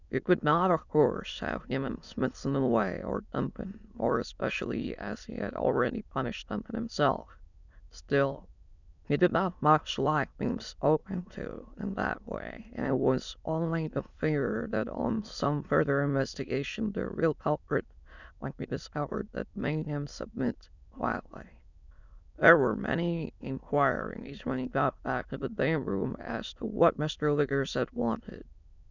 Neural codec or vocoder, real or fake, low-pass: autoencoder, 22.05 kHz, a latent of 192 numbers a frame, VITS, trained on many speakers; fake; 7.2 kHz